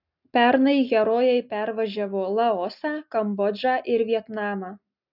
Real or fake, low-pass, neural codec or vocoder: real; 5.4 kHz; none